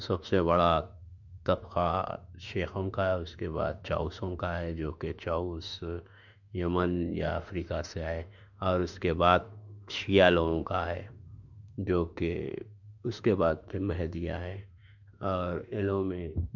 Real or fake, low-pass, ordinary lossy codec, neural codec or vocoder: fake; 7.2 kHz; none; autoencoder, 48 kHz, 32 numbers a frame, DAC-VAE, trained on Japanese speech